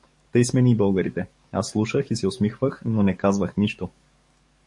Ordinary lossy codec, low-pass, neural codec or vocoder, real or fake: MP3, 48 kbps; 10.8 kHz; codec, 44.1 kHz, 7.8 kbps, DAC; fake